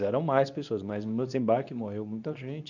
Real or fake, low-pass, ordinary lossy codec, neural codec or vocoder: fake; 7.2 kHz; none; codec, 24 kHz, 0.9 kbps, WavTokenizer, medium speech release version 2